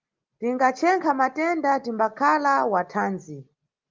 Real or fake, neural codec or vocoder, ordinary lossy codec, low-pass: real; none; Opus, 32 kbps; 7.2 kHz